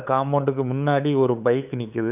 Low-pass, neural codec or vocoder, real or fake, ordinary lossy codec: 3.6 kHz; codec, 16 kHz, 8 kbps, FunCodec, trained on LibriTTS, 25 frames a second; fake; none